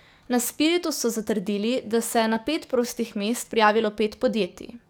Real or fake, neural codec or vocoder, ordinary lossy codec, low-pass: fake; codec, 44.1 kHz, 7.8 kbps, DAC; none; none